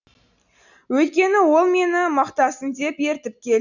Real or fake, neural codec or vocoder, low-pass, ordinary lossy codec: real; none; 7.2 kHz; none